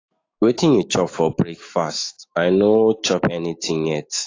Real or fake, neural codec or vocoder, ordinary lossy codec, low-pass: fake; autoencoder, 48 kHz, 128 numbers a frame, DAC-VAE, trained on Japanese speech; AAC, 32 kbps; 7.2 kHz